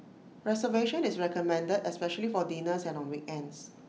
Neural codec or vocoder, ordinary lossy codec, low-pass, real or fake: none; none; none; real